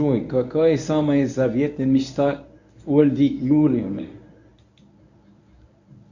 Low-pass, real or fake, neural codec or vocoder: 7.2 kHz; fake; codec, 24 kHz, 0.9 kbps, WavTokenizer, medium speech release version 1